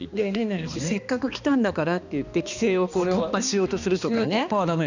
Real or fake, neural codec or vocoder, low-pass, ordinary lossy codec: fake; codec, 16 kHz, 2 kbps, X-Codec, HuBERT features, trained on balanced general audio; 7.2 kHz; none